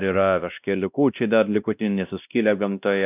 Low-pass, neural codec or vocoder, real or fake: 3.6 kHz; codec, 16 kHz, 1 kbps, X-Codec, WavLM features, trained on Multilingual LibriSpeech; fake